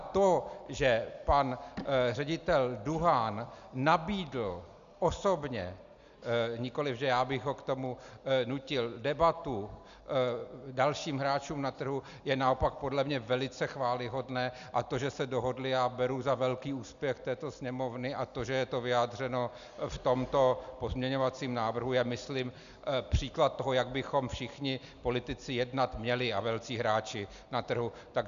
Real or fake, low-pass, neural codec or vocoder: real; 7.2 kHz; none